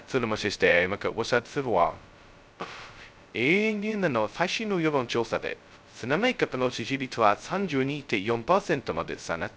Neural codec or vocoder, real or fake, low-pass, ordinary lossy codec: codec, 16 kHz, 0.2 kbps, FocalCodec; fake; none; none